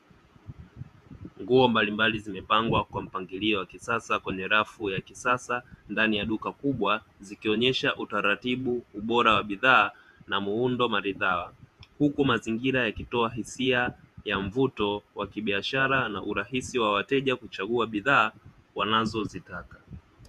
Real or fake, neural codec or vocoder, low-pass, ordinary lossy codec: fake; vocoder, 48 kHz, 128 mel bands, Vocos; 14.4 kHz; Opus, 64 kbps